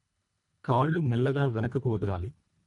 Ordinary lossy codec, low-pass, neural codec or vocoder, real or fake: none; 10.8 kHz; codec, 24 kHz, 1.5 kbps, HILCodec; fake